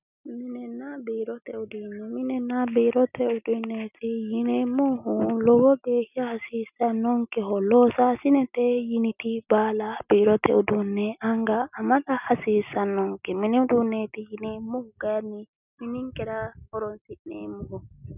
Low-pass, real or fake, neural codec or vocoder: 3.6 kHz; real; none